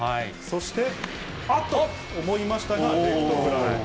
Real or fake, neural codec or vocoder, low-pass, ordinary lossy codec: real; none; none; none